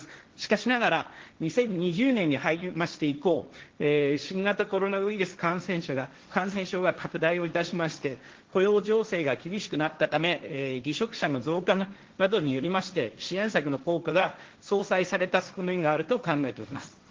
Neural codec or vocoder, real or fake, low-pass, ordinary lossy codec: codec, 16 kHz, 1.1 kbps, Voila-Tokenizer; fake; 7.2 kHz; Opus, 16 kbps